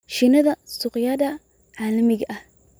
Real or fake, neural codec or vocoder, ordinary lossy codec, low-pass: real; none; none; none